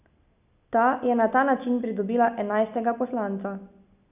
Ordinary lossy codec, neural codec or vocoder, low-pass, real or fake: none; none; 3.6 kHz; real